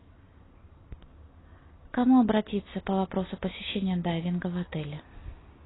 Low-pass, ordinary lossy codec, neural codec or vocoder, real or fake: 7.2 kHz; AAC, 16 kbps; none; real